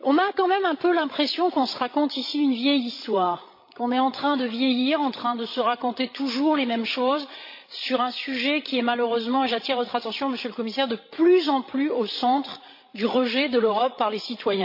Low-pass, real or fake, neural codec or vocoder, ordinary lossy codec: 5.4 kHz; fake; codec, 16 kHz, 16 kbps, FreqCodec, larger model; MP3, 32 kbps